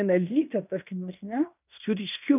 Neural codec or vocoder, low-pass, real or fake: codec, 16 kHz in and 24 kHz out, 0.9 kbps, LongCat-Audio-Codec, fine tuned four codebook decoder; 3.6 kHz; fake